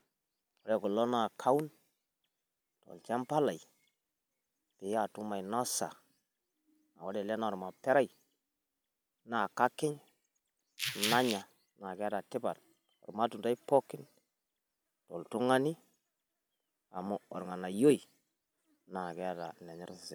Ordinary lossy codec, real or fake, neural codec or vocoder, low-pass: none; real; none; none